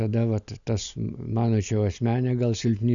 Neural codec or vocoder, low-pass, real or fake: none; 7.2 kHz; real